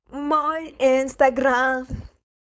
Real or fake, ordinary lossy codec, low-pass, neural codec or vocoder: fake; none; none; codec, 16 kHz, 4.8 kbps, FACodec